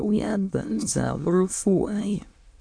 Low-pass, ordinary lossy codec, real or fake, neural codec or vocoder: 9.9 kHz; AAC, 64 kbps; fake; autoencoder, 22.05 kHz, a latent of 192 numbers a frame, VITS, trained on many speakers